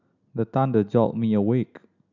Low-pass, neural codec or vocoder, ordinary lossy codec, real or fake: 7.2 kHz; none; none; real